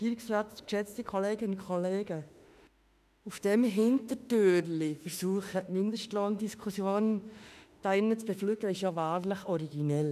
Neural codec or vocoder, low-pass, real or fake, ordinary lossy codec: autoencoder, 48 kHz, 32 numbers a frame, DAC-VAE, trained on Japanese speech; 14.4 kHz; fake; none